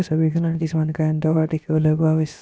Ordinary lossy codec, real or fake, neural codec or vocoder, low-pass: none; fake; codec, 16 kHz, about 1 kbps, DyCAST, with the encoder's durations; none